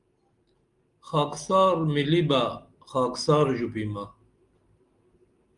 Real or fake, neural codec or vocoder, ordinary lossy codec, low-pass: real; none; Opus, 32 kbps; 10.8 kHz